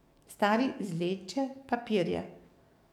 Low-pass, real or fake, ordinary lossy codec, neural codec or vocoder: 19.8 kHz; fake; none; codec, 44.1 kHz, 7.8 kbps, DAC